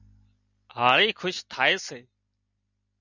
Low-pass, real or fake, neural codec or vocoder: 7.2 kHz; real; none